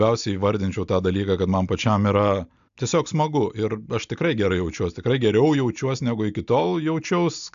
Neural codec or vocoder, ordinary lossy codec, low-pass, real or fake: none; AAC, 96 kbps; 7.2 kHz; real